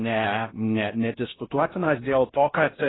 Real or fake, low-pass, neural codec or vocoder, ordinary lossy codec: fake; 7.2 kHz; codec, 16 kHz in and 24 kHz out, 0.6 kbps, FocalCodec, streaming, 2048 codes; AAC, 16 kbps